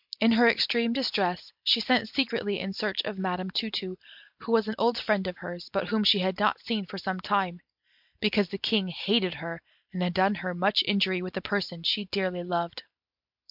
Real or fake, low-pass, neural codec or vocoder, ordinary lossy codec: real; 5.4 kHz; none; MP3, 48 kbps